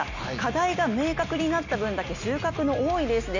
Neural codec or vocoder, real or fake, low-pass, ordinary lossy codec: none; real; 7.2 kHz; none